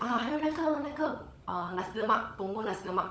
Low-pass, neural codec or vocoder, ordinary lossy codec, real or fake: none; codec, 16 kHz, 16 kbps, FunCodec, trained on LibriTTS, 50 frames a second; none; fake